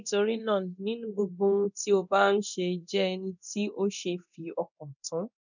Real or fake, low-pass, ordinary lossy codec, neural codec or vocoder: fake; 7.2 kHz; none; codec, 24 kHz, 0.9 kbps, DualCodec